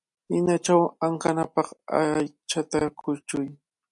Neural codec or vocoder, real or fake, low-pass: none; real; 10.8 kHz